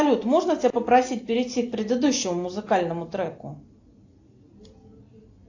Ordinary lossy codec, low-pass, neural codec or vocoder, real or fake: AAC, 48 kbps; 7.2 kHz; none; real